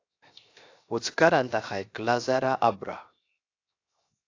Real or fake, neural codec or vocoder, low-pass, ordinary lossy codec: fake; codec, 16 kHz, 0.7 kbps, FocalCodec; 7.2 kHz; AAC, 48 kbps